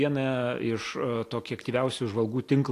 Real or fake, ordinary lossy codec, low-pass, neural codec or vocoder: real; AAC, 64 kbps; 14.4 kHz; none